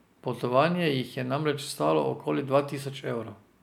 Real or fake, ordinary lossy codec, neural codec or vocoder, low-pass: real; none; none; 19.8 kHz